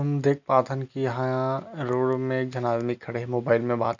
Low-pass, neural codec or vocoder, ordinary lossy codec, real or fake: 7.2 kHz; none; none; real